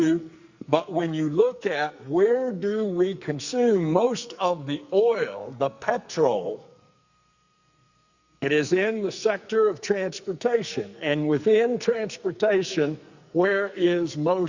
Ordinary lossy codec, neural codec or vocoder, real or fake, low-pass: Opus, 64 kbps; codec, 44.1 kHz, 2.6 kbps, SNAC; fake; 7.2 kHz